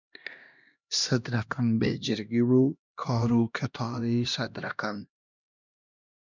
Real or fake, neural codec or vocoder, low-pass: fake; codec, 16 kHz, 1 kbps, X-Codec, HuBERT features, trained on LibriSpeech; 7.2 kHz